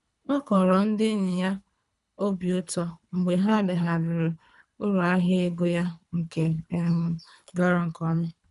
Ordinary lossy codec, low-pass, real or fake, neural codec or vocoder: none; 10.8 kHz; fake; codec, 24 kHz, 3 kbps, HILCodec